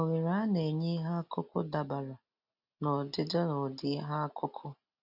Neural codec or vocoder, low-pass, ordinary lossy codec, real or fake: none; 5.4 kHz; none; real